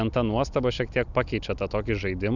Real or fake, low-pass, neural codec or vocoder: real; 7.2 kHz; none